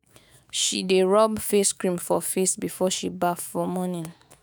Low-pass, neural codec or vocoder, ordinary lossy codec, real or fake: none; autoencoder, 48 kHz, 128 numbers a frame, DAC-VAE, trained on Japanese speech; none; fake